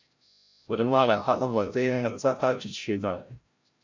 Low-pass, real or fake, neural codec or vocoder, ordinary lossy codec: 7.2 kHz; fake; codec, 16 kHz, 0.5 kbps, FreqCodec, larger model; MP3, 48 kbps